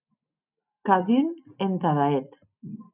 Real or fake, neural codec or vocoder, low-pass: real; none; 3.6 kHz